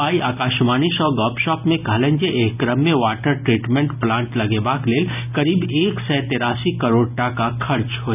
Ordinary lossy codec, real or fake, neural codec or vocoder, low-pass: none; real; none; 3.6 kHz